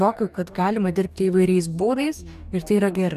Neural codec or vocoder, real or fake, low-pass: codec, 44.1 kHz, 2.6 kbps, DAC; fake; 14.4 kHz